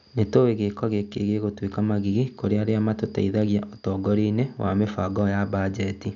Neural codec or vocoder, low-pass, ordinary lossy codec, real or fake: none; 7.2 kHz; none; real